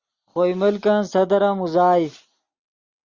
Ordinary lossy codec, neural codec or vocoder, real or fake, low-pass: Opus, 64 kbps; none; real; 7.2 kHz